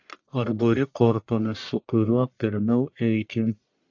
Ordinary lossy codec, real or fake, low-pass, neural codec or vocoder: AAC, 48 kbps; fake; 7.2 kHz; codec, 44.1 kHz, 1.7 kbps, Pupu-Codec